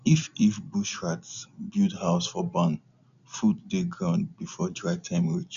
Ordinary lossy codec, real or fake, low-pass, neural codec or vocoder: none; real; 7.2 kHz; none